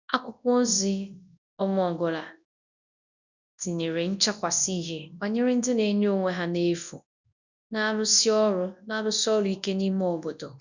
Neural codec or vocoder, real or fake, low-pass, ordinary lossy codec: codec, 24 kHz, 0.9 kbps, WavTokenizer, large speech release; fake; 7.2 kHz; none